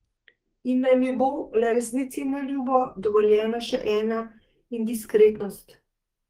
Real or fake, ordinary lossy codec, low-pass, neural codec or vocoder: fake; Opus, 24 kbps; 14.4 kHz; codec, 32 kHz, 1.9 kbps, SNAC